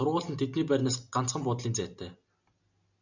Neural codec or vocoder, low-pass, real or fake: none; 7.2 kHz; real